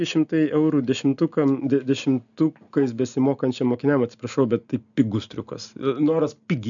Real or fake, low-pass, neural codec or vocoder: real; 7.2 kHz; none